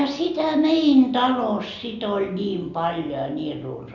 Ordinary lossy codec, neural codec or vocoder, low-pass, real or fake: none; none; 7.2 kHz; real